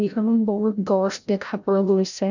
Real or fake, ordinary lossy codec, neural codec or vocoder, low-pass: fake; none; codec, 16 kHz, 0.5 kbps, FreqCodec, larger model; 7.2 kHz